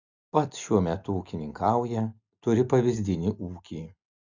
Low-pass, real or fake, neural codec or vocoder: 7.2 kHz; real; none